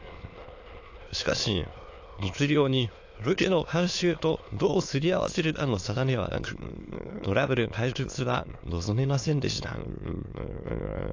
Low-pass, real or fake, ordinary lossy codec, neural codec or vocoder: 7.2 kHz; fake; AAC, 48 kbps; autoencoder, 22.05 kHz, a latent of 192 numbers a frame, VITS, trained on many speakers